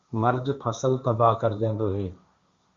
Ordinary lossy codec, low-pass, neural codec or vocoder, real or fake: Opus, 64 kbps; 7.2 kHz; codec, 16 kHz, 1.1 kbps, Voila-Tokenizer; fake